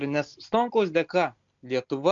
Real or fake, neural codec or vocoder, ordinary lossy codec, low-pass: real; none; MP3, 64 kbps; 7.2 kHz